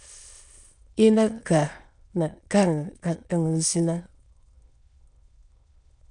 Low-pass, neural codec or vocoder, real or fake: 9.9 kHz; autoencoder, 22.05 kHz, a latent of 192 numbers a frame, VITS, trained on many speakers; fake